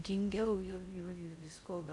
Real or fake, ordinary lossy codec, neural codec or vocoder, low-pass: fake; MP3, 96 kbps; codec, 16 kHz in and 24 kHz out, 0.6 kbps, FocalCodec, streaming, 4096 codes; 10.8 kHz